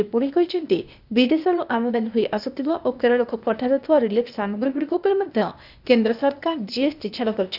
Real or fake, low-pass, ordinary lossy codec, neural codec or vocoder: fake; 5.4 kHz; none; codec, 16 kHz, 0.8 kbps, ZipCodec